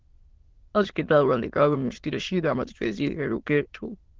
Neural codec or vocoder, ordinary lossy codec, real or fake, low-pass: autoencoder, 22.05 kHz, a latent of 192 numbers a frame, VITS, trained on many speakers; Opus, 16 kbps; fake; 7.2 kHz